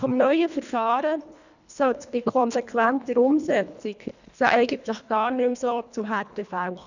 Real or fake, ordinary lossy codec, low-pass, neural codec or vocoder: fake; none; 7.2 kHz; codec, 24 kHz, 1.5 kbps, HILCodec